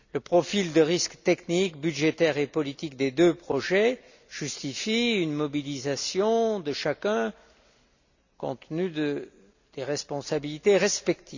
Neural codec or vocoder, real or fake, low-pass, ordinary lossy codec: none; real; 7.2 kHz; none